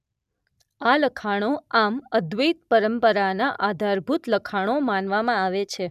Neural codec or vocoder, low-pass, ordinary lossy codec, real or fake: none; 14.4 kHz; none; real